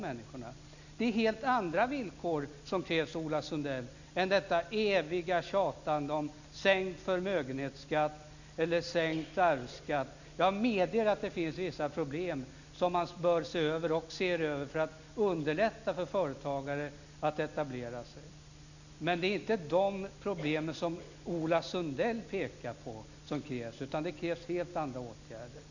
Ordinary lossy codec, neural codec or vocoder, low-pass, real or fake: none; none; 7.2 kHz; real